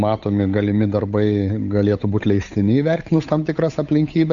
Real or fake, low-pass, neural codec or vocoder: fake; 7.2 kHz; codec, 16 kHz, 8 kbps, FunCodec, trained on Chinese and English, 25 frames a second